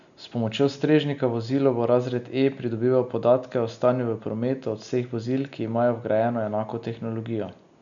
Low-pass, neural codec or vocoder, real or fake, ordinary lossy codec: 7.2 kHz; none; real; MP3, 96 kbps